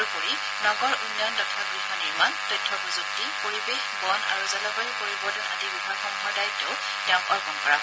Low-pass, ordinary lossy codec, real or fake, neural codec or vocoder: none; none; real; none